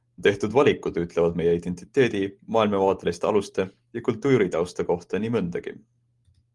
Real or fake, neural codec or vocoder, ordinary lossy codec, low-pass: real; none; Opus, 24 kbps; 10.8 kHz